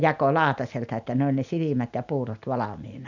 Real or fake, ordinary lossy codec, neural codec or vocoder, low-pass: real; none; none; 7.2 kHz